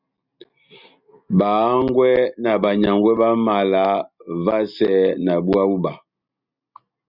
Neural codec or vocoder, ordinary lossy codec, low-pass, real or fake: none; Opus, 64 kbps; 5.4 kHz; real